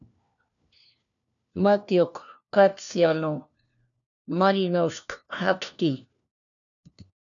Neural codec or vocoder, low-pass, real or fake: codec, 16 kHz, 1 kbps, FunCodec, trained on LibriTTS, 50 frames a second; 7.2 kHz; fake